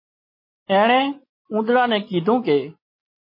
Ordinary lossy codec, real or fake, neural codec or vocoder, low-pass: MP3, 24 kbps; real; none; 5.4 kHz